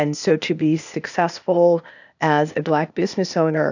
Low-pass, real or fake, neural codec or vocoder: 7.2 kHz; fake; codec, 16 kHz, 0.8 kbps, ZipCodec